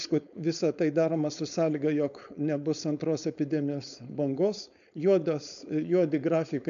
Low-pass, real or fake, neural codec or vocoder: 7.2 kHz; fake; codec, 16 kHz, 4.8 kbps, FACodec